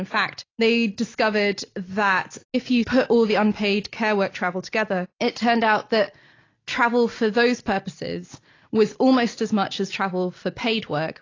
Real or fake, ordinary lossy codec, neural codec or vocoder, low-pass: real; AAC, 32 kbps; none; 7.2 kHz